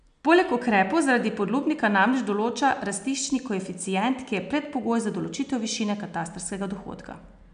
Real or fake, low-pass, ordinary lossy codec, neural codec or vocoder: real; 9.9 kHz; none; none